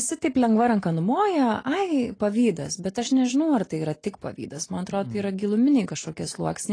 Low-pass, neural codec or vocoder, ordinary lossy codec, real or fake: 9.9 kHz; none; AAC, 32 kbps; real